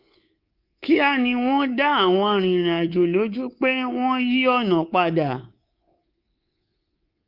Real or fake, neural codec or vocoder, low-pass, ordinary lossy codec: fake; codec, 24 kHz, 3.1 kbps, DualCodec; 5.4 kHz; Opus, 24 kbps